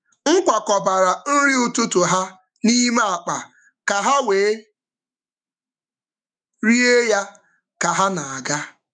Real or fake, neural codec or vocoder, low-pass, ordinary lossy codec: fake; autoencoder, 48 kHz, 128 numbers a frame, DAC-VAE, trained on Japanese speech; 9.9 kHz; none